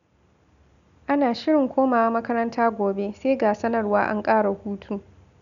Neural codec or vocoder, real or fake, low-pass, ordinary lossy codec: none; real; 7.2 kHz; none